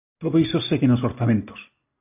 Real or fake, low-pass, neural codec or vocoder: real; 3.6 kHz; none